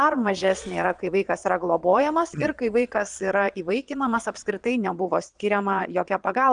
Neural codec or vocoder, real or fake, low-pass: vocoder, 22.05 kHz, 80 mel bands, Vocos; fake; 9.9 kHz